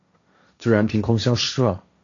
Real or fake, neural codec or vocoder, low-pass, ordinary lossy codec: fake; codec, 16 kHz, 1.1 kbps, Voila-Tokenizer; 7.2 kHz; AAC, 48 kbps